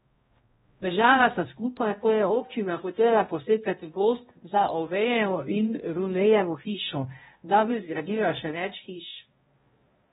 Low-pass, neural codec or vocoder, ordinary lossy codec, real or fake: 7.2 kHz; codec, 16 kHz, 0.5 kbps, X-Codec, HuBERT features, trained on balanced general audio; AAC, 16 kbps; fake